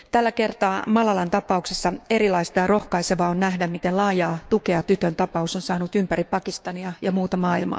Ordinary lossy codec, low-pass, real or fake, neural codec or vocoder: none; none; fake; codec, 16 kHz, 6 kbps, DAC